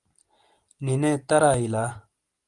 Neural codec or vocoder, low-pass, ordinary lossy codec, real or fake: none; 10.8 kHz; Opus, 32 kbps; real